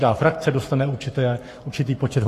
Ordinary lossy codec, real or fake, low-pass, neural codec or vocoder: AAC, 48 kbps; fake; 14.4 kHz; codec, 44.1 kHz, 7.8 kbps, Pupu-Codec